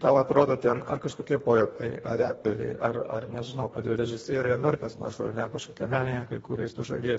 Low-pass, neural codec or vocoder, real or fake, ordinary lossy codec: 10.8 kHz; codec, 24 kHz, 1.5 kbps, HILCodec; fake; AAC, 24 kbps